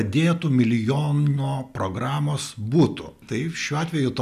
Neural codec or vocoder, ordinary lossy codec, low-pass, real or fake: none; AAC, 96 kbps; 14.4 kHz; real